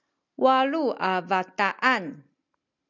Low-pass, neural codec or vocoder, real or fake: 7.2 kHz; none; real